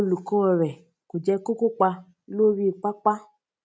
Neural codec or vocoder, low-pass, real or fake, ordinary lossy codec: none; none; real; none